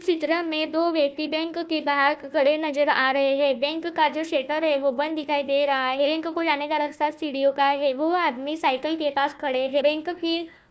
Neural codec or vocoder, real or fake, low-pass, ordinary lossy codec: codec, 16 kHz, 1 kbps, FunCodec, trained on Chinese and English, 50 frames a second; fake; none; none